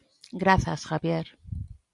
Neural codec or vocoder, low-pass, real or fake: none; 10.8 kHz; real